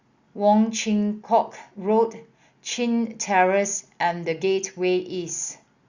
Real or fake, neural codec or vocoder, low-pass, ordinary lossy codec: real; none; 7.2 kHz; Opus, 64 kbps